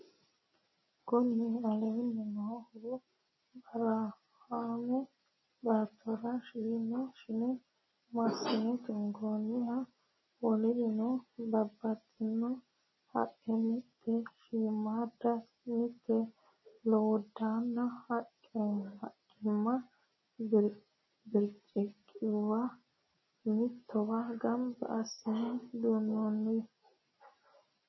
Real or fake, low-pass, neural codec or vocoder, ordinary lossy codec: real; 7.2 kHz; none; MP3, 24 kbps